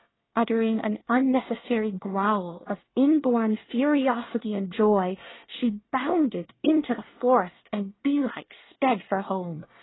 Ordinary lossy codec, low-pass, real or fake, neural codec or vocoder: AAC, 16 kbps; 7.2 kHz; fake; codec, 24 kHz, 1 kbps, SNAC